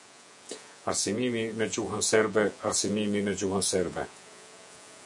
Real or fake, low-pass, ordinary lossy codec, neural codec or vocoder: fake; 10.8 kHz; MP3, 96 kbps; vocoder, 48 kHz, 128 mel bands, Vocos